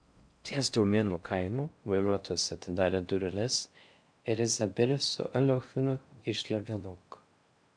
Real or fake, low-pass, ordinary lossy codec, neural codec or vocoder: fake; 9.9 kHz; MP3, 96 kbps; codec, 16 kHz in and 24 kHz out, 0.6 kbps, FocalCodec, streaming, 4096 codes